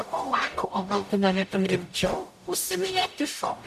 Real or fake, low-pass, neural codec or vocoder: fake; 14.4 kHz; codec, 44.1 kHz, 0.9 kbps, DAC